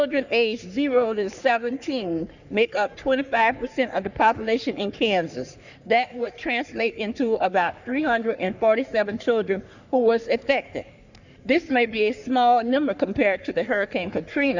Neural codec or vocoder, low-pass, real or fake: codec, 44.1 kHz, 3.4 kbps, Pupu-Codec; 7.2 kHz; fake